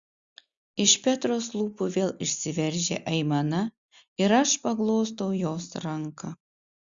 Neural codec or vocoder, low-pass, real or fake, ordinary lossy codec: none; 7.2 kHz; real; Opus, 64 kbps